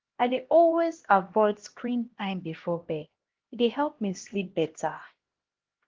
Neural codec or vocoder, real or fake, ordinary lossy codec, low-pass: codec, 16 kHz, 1 kbps, X-Codec, HuBERT features, trained on LibriSpeech; fake; Opus, 16 kbps; 7.2 kHz